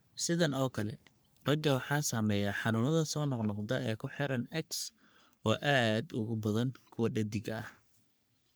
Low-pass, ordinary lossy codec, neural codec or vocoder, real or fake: none; none; codec, 44.1 kHz, 3.4 kbps, Pupu-Codec; fake